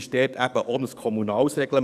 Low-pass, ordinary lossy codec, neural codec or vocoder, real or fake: 14.4 kHz; none; codec, 44.1 kHz, 7.8 kbps, DAC; fake